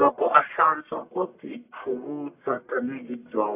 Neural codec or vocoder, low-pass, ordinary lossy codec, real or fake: codec, 44.1 kHz, 1.7 kbps, Pupu-Codec; 3.6 kHz; AAC, 32 kbps; fake